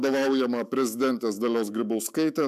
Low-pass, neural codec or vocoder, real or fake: 14.4 kHz; autoencoder, 48 kHz, 128 numbers a frame, DAC-VAE, trained on Japanese speech; fake